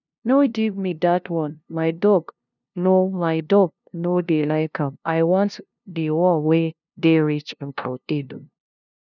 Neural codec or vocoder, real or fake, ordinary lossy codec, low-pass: codec, 16 kHz, 0.5 kbps, FunCodec, trained on LibriTTS, 25 frames a second; fake; none; 7.2 kHz